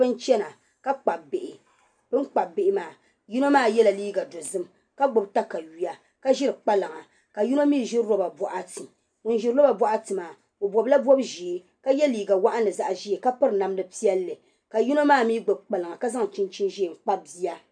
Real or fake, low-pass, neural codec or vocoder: real; 9.9 kHz; none